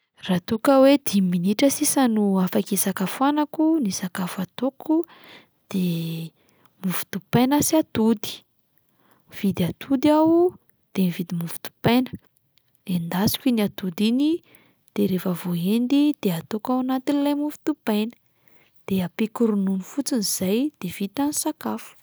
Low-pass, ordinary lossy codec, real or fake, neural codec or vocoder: none; none; real; none